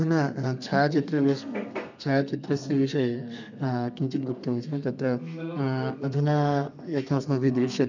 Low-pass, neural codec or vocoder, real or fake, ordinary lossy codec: 7.2 kHz; codec, 32 kHz, 1.9 kbps, SNAC; fake; none